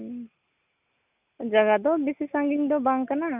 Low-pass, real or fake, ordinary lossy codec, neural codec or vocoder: 3.6 kHz; real; none; none